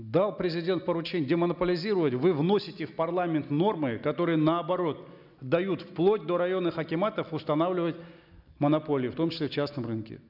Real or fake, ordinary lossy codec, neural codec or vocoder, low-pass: real; none; none; 5.4 kHz